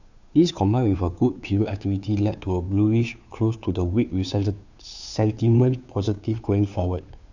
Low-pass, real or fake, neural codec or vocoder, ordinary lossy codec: 7.2 kHz; fake; codec, 16 kHz, 2 kbps, FunCodec, trained on Chinese and English, 25 frames a second; none